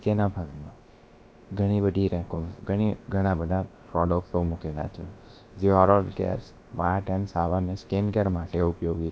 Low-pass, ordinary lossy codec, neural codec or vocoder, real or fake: none; none; codec, 16 kHz, about 1 kbps, DyCAST, with the encoder's durations; fake